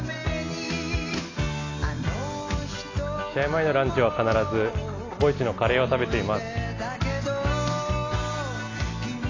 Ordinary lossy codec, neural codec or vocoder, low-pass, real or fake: AAC, 32 kbps; none; 7.2 kHz; real